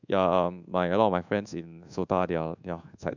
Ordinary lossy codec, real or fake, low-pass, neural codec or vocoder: none; fake; 7.2 kHz; codec, 24 kHz, 1.2 kbps, DualCodec